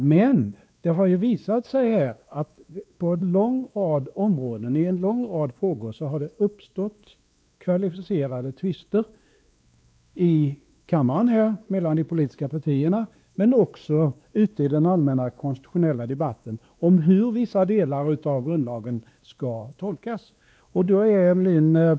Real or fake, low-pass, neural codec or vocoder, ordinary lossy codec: fake; none; codec, 16 kHz, 2 kbps, X-Codec, WavLM features, trained on Multilingual LibriSpeech; none